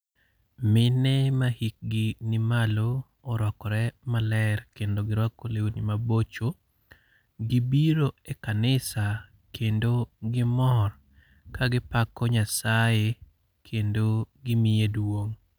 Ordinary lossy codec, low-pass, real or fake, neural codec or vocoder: none; none; real; none